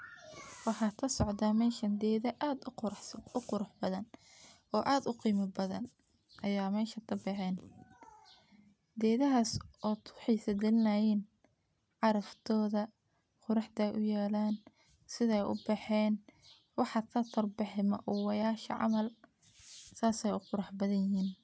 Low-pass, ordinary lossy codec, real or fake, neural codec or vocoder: none; none; real; none